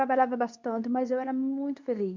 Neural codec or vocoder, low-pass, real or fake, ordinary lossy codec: codec, 24 kHz, 0.9 kbps, WavTokenizer, medium speech release version 2; 7.2 kHz; fake; MP3, 64 kbps